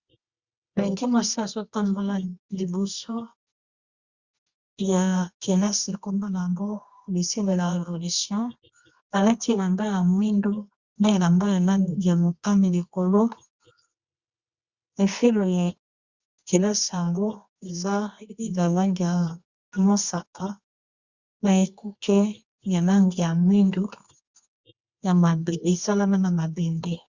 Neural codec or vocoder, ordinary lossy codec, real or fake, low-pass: codec, 24 kHz, 0.9 kbps, WavTokenizer, medium music audio release; Opus, 64 kbps; fake; 7.2 kHz